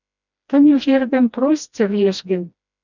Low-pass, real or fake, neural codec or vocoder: 7.2 kHz; fake; codec, 16 kHz, 1 kbps, FreqCodec, smaller model